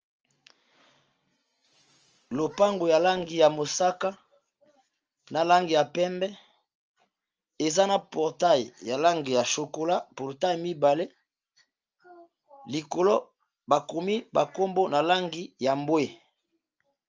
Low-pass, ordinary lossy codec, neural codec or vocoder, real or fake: 7.2 kHz; Opus, 24 kbps; none; real